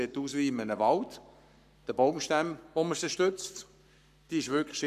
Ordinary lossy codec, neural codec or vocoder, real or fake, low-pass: none; codec, 44.1 kHz, 7.8 kbps, Pupu-Codec; fake; 14.4 kHz